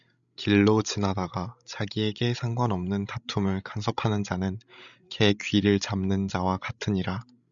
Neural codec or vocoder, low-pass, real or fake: codec, 16 kHz, 16 kbps, FreqCodec, larger model; 7.2 kHz; fake